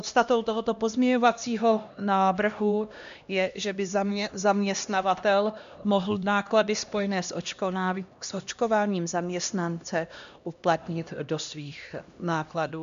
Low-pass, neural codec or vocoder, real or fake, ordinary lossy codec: 7.2 kHz; codec, 16 kHz, 1 kbps, X-Codec, HuBERT features, trained on LibriSpeech; fake; MP3, 64 kbps